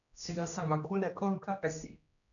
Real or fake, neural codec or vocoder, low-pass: fake; codec, 16 kHz, 1 kbps, X-Codec, HuBERT features, trained on general audio; 7.2 kHz